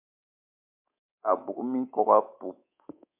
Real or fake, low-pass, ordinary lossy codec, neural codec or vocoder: fake; 3.6 kHz; MP3, 32 kbps; codec, 24 kHz, 3.1 kbps, DualCodec